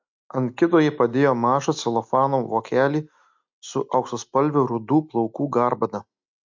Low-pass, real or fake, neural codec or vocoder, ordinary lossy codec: 7.2 kHz; real; none; MP3, 64 kbps